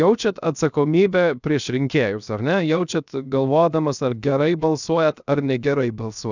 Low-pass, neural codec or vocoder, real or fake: 7.2 kHz; codec, 16 kHz, 0.7 kbps, FocalCodec; fake